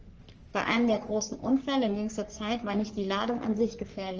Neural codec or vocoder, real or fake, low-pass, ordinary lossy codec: codec, 44.1 kHz, 3.4 kbps, Pupu-Codec; fake; 7.2 kHz; Opus, 24 kbps